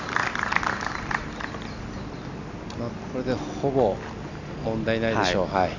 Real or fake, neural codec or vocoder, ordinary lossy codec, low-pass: real; none; none; 7.2 kHz